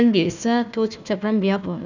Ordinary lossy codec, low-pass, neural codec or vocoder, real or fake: none; 7.2 kHz; codec, 16 kHz, 1 kbps, FunCodec, trained on Chinese and English, 50 frames a second; fake